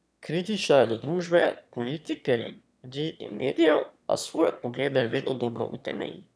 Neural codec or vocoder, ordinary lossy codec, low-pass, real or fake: autoencoder, 22.05 kHz, a latent of 192 numbers a frame, VITS, trained on one speaker; none; none; fake